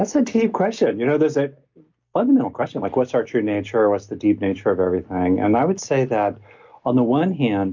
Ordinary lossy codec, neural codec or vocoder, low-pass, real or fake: MP3, 48 kbps; none; 7.2 kHz; real